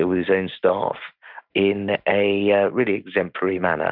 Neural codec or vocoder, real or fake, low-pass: none; real; 5.4 kHz